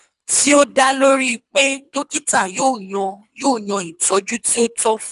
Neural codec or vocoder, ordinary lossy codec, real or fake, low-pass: codec, 24 kHz, 3 kbps, HILCodec; none; fake; 10.8 kHz